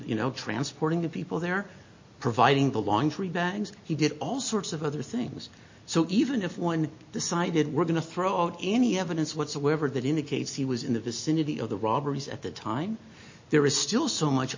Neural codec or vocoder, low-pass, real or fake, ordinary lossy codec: none; 7.2 kHz; real; MP3, 32 kbps